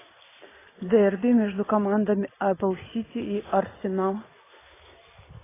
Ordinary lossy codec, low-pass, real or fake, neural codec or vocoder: AAC, 16 kbps; 3.6 kHz; real; none